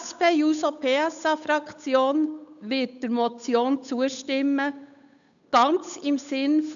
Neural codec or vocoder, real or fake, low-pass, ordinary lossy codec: codec, 16 kHz, 8 kbps, FunCodec, trained on Chinese and English, 25 frames a second; fake; 7.2 kHz; none